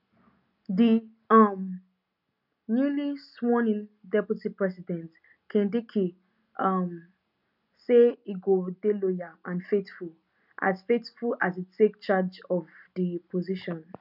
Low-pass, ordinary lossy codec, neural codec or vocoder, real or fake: 5.4 kHz; none; none; real